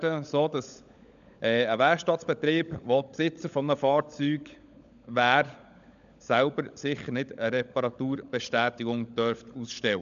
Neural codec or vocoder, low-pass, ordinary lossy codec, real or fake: codec, 16 kHz, 16 kbps, FunCodec, trained on LibriTTS, 50 frames a second; 7.2 kHz; none; fake